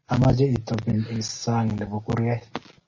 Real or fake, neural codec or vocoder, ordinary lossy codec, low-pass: real; none; MP3, 32 kbps; 7.2 kHz